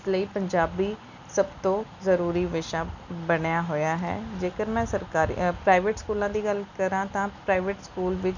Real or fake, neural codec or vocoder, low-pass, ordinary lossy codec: real; none; 7.2 kHz; none